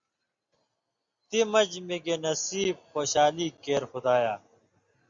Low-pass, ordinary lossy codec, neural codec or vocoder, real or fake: 7.2 kHz; Opus, 64 kbps; none; real